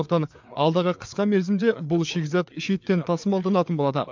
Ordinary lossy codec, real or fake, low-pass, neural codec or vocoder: MP3, 64 kbps; fake; 7.2 kHz; codec, 16 kHz, 4 kbps, FreqCodec, larger model